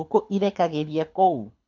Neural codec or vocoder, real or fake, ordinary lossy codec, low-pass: codec, 24 kHz, 6 kbps, HILCodec; fake; none; 7.2 kHz